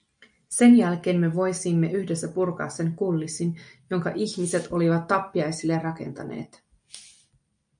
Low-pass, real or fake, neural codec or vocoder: 9.9 kHz; real; none